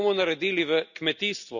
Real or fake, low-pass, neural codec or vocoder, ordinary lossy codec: real; 7.2 kHz; none; none